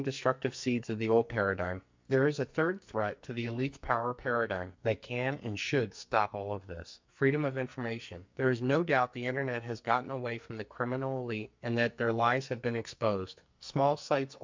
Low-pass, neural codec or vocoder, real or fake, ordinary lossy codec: 7.2 kHz; codec, 44.1 kHz, 2.6 kbps, SNAC; fake; MP3, 64 kbps